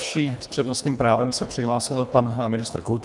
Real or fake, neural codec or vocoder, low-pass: fake; codec, 24 kHz, 1.5 kbps, HILCodec; 10.8 kHz